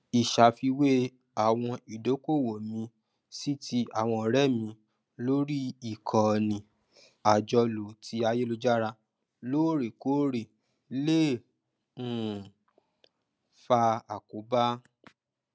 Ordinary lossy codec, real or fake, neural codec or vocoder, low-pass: none; real; none; none